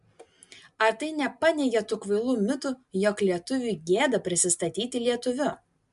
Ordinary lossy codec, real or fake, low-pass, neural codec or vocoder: MP3, 64 kbps; real; 10.8 kHz; none